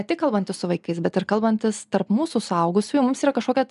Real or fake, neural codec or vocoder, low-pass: real; none; 10.8 kHz